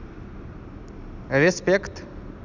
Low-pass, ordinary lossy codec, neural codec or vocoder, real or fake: 7.2 kHz; none; none; real